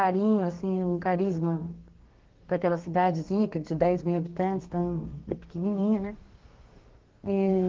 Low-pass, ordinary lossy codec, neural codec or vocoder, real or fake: 7.2 kHz; Opus, 16 kbps; codec, 32 kHz, 1.9 kbps, SNAC; fake